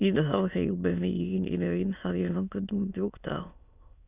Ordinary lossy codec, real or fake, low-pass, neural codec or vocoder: none; fake; 3.6 kHz; autoencoder, 22.05 kHz, a latent of 192 numbers a frame, VITS, trained on many speakers